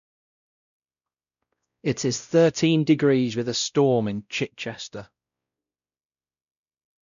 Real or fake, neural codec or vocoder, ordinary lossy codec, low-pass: fake; codec, 16 kHz, 0.5 kbps, X-Codec, WavLM features, trained on Multilingual LibriSpeech; none; 7.2 kHz